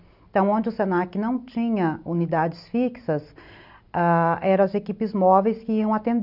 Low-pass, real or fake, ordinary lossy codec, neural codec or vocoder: 5.4 kHz; real; none; none